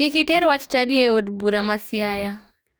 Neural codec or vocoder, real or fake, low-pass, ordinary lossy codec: codec, 44.1 kHz, 2.6 kbps, DAC; fake; none; none